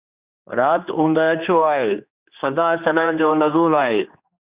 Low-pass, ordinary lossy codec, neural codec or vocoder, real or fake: 3.6 kHz; Opus, 64 kbps; codec, 16 kHz, 2 kbps, X-Codec, HuBERT features, trained on general audio; fake